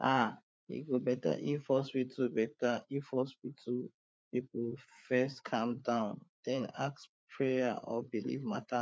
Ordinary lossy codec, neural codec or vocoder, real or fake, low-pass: none; codec, 16 kHz, 4 kbps, FreqCodec, larger model; fake; none